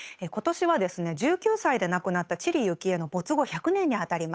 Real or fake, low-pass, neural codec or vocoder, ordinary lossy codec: fake; none; codec, 16 kHz, 8 kbps, FunCodec, trained on Chinese and English, 25 frames a second; none